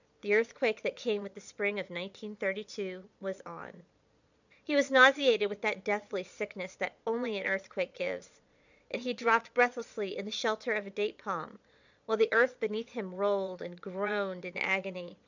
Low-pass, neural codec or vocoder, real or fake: 7.2 kHz; vocoder, 22.05 kHz, 80 mel bands, Vocos; fake